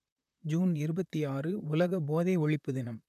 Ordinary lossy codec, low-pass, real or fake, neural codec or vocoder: none; 14.4 kHz; fake; vocoder, 44.1 kHz, 128 mel bands, Pupu-Vocoder